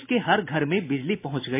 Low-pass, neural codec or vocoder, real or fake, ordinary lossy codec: 3.6 kHz; none; real; MP3, 24 kbps